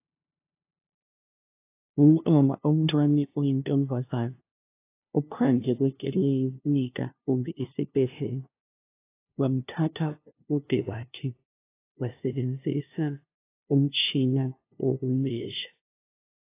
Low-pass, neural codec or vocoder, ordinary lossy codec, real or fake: 3.6 kHz; codec, 16 kHz, 0.5 kbps, FunCodec, trained on LibriTTS, 25 frames a second; AAC, 24 kbps; fake